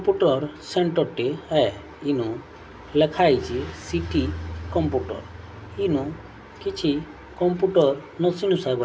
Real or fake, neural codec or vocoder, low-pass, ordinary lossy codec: real; none; none; none